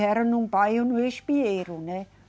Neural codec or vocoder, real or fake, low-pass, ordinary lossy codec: none; real; none; none